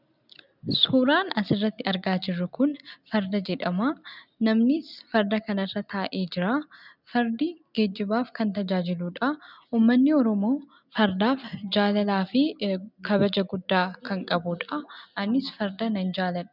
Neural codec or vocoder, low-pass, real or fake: none; 5.4 kHz; real